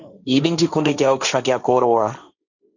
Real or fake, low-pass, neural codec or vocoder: fake; 7.2 kHz; codec, 16 kHz, 1.1 kbps, Voila-Tokenizer